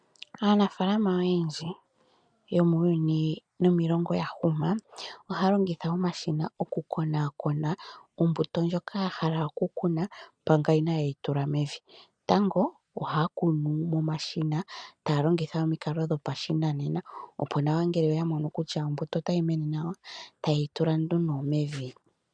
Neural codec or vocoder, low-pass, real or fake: none; 9.9 kHz; real